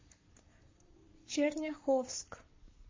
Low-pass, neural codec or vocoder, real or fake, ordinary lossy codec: 7.2 kHz; codec, 44.1 kHz, 7.8 kbps, Pupu-Codec; fake; MP3, 32 kbps